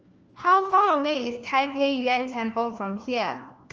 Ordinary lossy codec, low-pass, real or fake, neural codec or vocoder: Opus, 24 kbps; 7.2 kHz; fake; codec, 16 kHz, 1 kbps, FunCodec, trained on LibriTTS, 50 frames a second